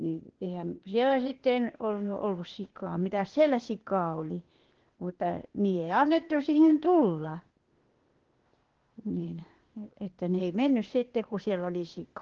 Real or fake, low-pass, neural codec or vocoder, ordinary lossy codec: fake; 7.2 kHz; codec, 16 kHz, 0.8 kbps, ZipCodec; Opus, 16 kbps